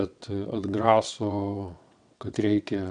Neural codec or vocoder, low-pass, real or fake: vocoder, 22.05 kHz, 80 mel bands, WaveNeXt; 9.9 kHz; fake